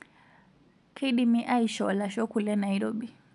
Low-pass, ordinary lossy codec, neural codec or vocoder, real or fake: 10.8 kHz; none; none; real